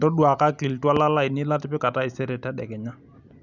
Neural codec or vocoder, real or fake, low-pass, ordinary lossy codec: none; real; 7.2 kHz; none